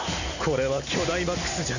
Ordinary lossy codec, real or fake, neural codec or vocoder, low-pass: none; real; none; 7.2 kHz